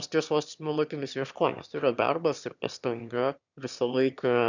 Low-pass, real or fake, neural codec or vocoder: 7.2 kHz; fake; autoencoder, 22.05 kHz, a latent of 192 numbers a frame, VITS, trained on one speaker